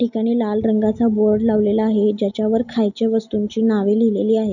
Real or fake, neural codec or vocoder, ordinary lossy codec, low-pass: real; none; none; 7.2 kHz